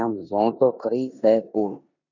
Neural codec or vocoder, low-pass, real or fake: codec, 16 kHz in and 24 kHz out, 0.9 kbps, LongCat-Audio-Codec, four codebook decoder; 7.2 kHz; fake